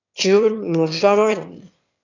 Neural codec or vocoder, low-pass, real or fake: autoencoder, 22.05 kHz, a latent of 192 numbers a frame, VITS, trained on one speaker; 7.2 kHz; fake